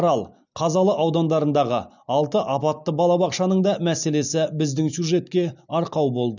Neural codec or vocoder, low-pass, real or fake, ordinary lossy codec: none; 7.2 kHz; real; none